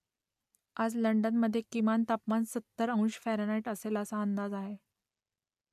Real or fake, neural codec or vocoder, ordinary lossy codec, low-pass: real; none; none; 14.4 kHz